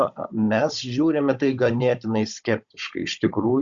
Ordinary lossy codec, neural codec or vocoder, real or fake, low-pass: Opus, 64 kbps; codec, 16 kHz, 16 kbps, FunCodec, trained on Chinese and English, 50 frames a second; fake; 7.2 kHz